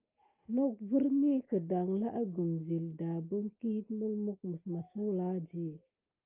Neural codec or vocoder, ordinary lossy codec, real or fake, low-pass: none; Opus, 32 kbps; real; 3.6 kHz